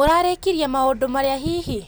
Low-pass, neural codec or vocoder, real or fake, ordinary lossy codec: none; none; real; none